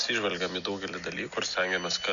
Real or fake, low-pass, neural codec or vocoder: real; 7.2 kHz; none